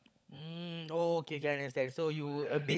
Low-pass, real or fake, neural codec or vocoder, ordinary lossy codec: none; fake; codec, 16 kHz, 16 kbps, FunCodec, trained on LibriTTS, 50 frames a second; none